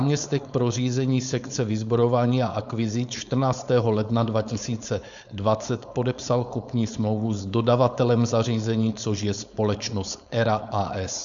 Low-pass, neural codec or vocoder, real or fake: 7.2 kHz; codec, 16 kHz, 4.8 kbps, FACodec; fake